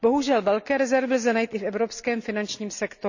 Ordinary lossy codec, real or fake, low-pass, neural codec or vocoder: none; real; 7.2 kHz; none